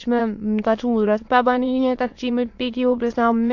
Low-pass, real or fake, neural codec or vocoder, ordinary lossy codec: 7.2 kHz; fake; autoencoder, 22.05 kHz, a latent of 192 numbers a frame, VITS, trained on many speakers; AAC, 48 kbps